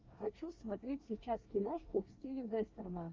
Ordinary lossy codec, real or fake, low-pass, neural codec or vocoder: Opus, 32 kbps; fake; 7.2 kHz; codec, 24 kHz, 1 kbps, SNAC